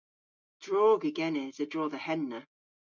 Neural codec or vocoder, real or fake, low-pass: none; real; 7.2 kHz